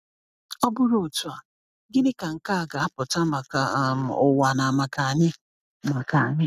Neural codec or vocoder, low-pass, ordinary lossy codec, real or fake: none; 14.4 kHz; none; real